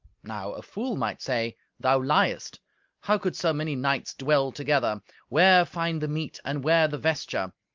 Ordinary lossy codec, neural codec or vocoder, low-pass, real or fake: Opus, 24 kbps; none; 7.2 kHz; real